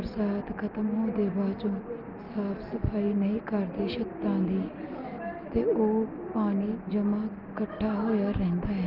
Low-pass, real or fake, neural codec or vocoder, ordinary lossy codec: 5.4 kHz; real; none; Opus, 32 kbps